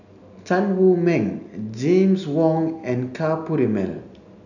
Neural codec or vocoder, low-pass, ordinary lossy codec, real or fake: none; 7.2 kHz; none; real